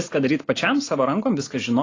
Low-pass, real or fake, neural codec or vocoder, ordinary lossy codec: 7.2 kHz; real; none; AAC, 32 kbps